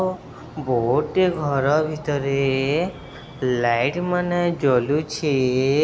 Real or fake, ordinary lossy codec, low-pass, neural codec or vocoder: real; none; none; none